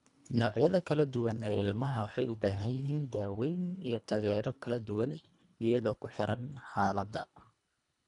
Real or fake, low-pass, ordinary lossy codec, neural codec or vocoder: fake; 10.8 kHz; none; codec, 24 kHz, 1.5 kbps, HILCodec